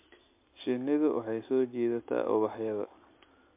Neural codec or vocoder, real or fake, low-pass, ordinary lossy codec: none; real; 3.6 kHz; MP3, 24 kbps